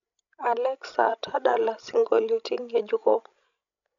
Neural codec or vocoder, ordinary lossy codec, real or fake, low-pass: codec, 16 kHz, 16 kbps, FreqCodec, larger model; none; fake; 7.2 kHz